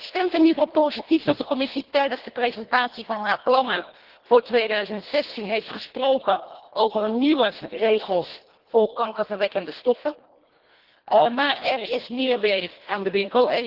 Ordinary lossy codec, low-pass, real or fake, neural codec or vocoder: Opus, 16 kbps; 5.4 kHz; fake; codec, 24 kHz, 1.5 kbps, HILCodec